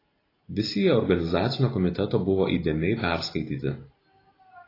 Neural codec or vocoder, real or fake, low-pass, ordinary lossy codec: none; real; 5.4 kHz; AAC, 24 kbps